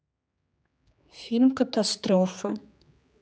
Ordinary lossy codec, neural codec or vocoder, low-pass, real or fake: none; codec, 16 kHz, 4 kbps, X-Codec, HuBERT features, trained on general audio; none; fake